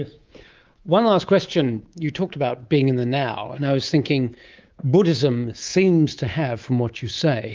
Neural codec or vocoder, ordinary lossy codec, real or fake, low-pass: codec, 24 kHz, 3.1 kbps, DualCodec; Opus, 32 kbps; fake; 7.2 kHz